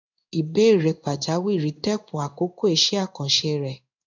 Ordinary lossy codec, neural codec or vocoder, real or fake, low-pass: none; codec, 16 kHz in and 24 kHz out, 1 kbps, XY-Tokenizer; fake; 7.2 kHz